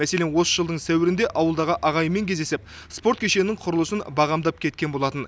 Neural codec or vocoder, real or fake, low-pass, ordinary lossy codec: none; real; none; none